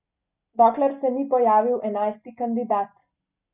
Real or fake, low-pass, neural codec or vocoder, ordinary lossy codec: real; 3.6 kHz; none; none